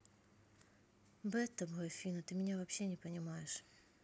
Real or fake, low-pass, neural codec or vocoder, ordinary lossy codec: real; none; none; none